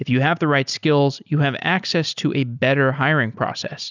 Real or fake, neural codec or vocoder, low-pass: real; none; 7.2 kHz